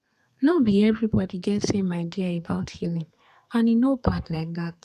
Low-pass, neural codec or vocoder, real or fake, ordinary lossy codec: 14.4 kHz; codec, 32 kHz, 1.9 kbps, SNAC; fake; none